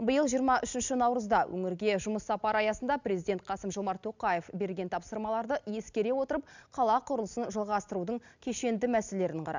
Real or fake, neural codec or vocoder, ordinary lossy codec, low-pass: real; none; none; 7.2 kHz